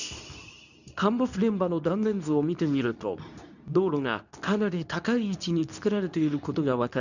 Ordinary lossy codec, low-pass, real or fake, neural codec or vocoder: none; 7.2 kHz; fake; codec, 24 kHz, 0.9 kbps, WavTokenizer, medium speech release version 2